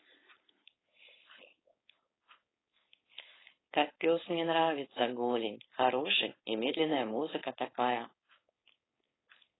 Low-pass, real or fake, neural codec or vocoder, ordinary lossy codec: 7.2 kHz; fake; codec, 16 kHz, 4.8 kbps, FACodec; AAC, 16 kbps